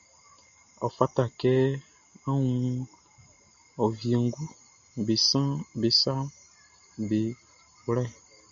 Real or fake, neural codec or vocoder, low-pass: real; none; 7.2 kHz